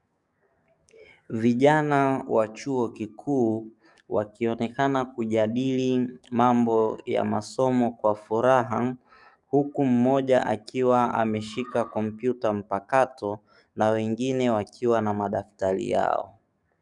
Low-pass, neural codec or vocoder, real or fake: 10.8 kHz; codec, 44.1 kHz, 7.8 kbps, DAC; fake